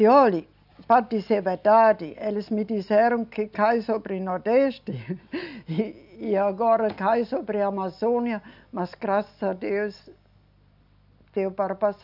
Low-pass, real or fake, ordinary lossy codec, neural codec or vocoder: 5.4 kHz; real; none; none